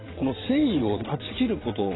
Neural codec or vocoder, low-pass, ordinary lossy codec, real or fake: vocoder, 22.05 kHz, 80 mel bands, WaveNeXt; 7.2 kHz; AAC, 16 kbps; fake